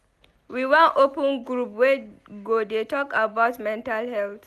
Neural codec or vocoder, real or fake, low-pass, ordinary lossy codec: none; real; 14.4 kHz; none